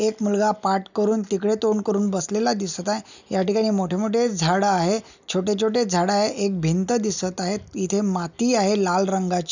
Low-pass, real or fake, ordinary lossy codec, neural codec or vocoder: 7.2 kHz; real; none; none